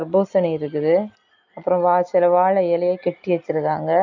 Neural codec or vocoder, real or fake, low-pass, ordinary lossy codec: none; real; 7.2 kHz; none